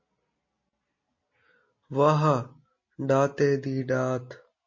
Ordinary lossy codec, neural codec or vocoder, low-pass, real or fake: MP3, 32 kbps; none; 7.2 kHz; real